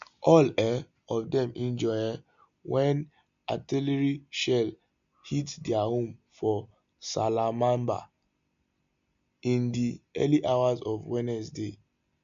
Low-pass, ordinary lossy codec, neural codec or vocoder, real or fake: 7.2 kHz; MP3, 64 kbps; none; real